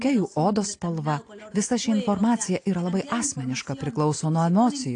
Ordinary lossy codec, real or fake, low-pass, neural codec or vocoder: AAC, 48 kbps; real; 9.9 kHz; none